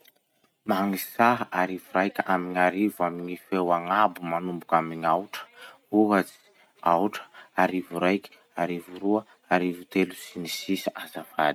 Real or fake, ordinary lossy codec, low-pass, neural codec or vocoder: real; none; 19.8 kHz; none